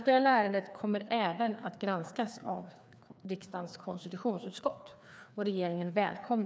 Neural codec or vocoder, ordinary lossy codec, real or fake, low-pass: codec, 16 kHz, 2 kbps, FreqCodec, larger model; none; fake; none